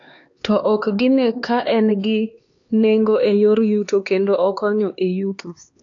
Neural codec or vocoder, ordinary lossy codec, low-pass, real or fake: codec, 16 kHz, 2 kbps, X-Codec, HuBERT features, trained on LibriSpeech; AAC, 48 kbps; 7.2 kHz; fake